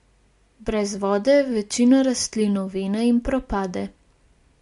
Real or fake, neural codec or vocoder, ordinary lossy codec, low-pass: real; none; MP3, 64 kbps; 10.8 kHz